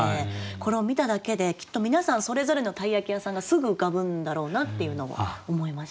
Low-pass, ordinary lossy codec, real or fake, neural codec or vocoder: none; none; real; none